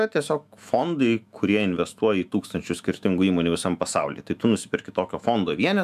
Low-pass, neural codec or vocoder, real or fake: 14.4 kHz; autoencoder, 48 kHz, 128 numbers a frame, DAC-VAE, trained on Japanese speech; fake